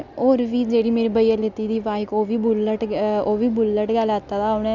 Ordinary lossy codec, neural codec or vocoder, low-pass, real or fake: none; none; 7.2 kHz; real